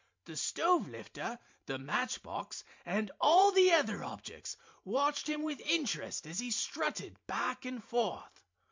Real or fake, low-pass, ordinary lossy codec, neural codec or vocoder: fake; 7.2 kHz; MP3, 64 kbps; vocoder, 44.1 kHz, 128 mel bands every 512 samples, BigVGAN v2